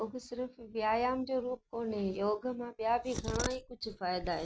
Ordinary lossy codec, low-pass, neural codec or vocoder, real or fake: none; none; none; real